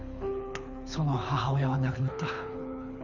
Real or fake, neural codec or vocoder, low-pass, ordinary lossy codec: fake; codec, 24 kHz, 6 kbps, HILCodec; 7.2 kHz; none